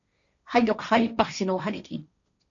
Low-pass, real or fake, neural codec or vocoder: 7.2 kHz; fake; codec, 16 kHz, 1.1 kbps, Voila-Tokenizer